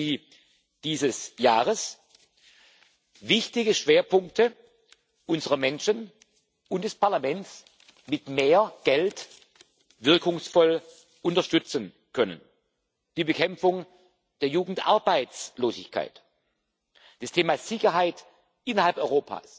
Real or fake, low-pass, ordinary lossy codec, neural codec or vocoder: real; none; none; none